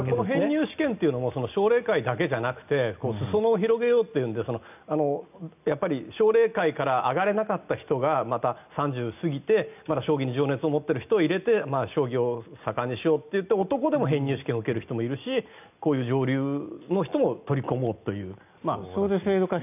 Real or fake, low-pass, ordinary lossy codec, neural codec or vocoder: real; 3.6 kHz; none; none